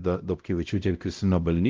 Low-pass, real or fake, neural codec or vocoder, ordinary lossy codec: 7.2 kHz; fake; codec, 16 kHz, 0.5 kbps, X-Codec, WavLM features, trained on Multilingual LibriSpeech; Opus, 24 kbps